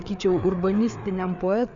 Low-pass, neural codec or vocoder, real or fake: 7.2 kHz; codec, 16 kHz, 4 kbps, FreqCodec, larger model; fake